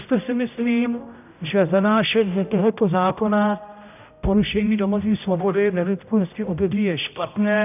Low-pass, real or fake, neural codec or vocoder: 3.6 kHz; fake; codec, 16 kHz, 0.5 kbps, X-Codec, HuBERT features, trained on general audio